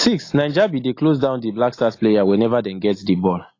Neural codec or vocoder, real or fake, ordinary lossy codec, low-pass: none; real; AAC, 32 kbps; 7.2 kHz